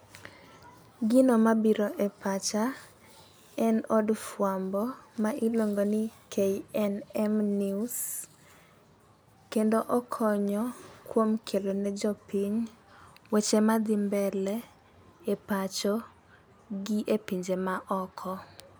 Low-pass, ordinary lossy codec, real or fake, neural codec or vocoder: none; none; real; none